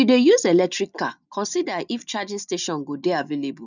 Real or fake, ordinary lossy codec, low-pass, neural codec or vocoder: real; none; 7.2 kHz; none